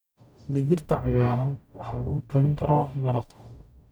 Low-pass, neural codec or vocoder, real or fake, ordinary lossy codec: none; codec, 44.1 kHz, 0.9 kbps, DAC; fake; none